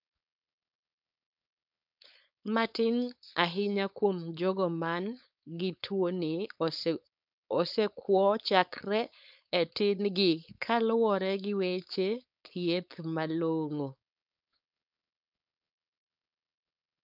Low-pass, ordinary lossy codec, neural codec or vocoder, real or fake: 5.4 kHz; none; codec, 16 kHz, 4.8 kbps, FACodec; fake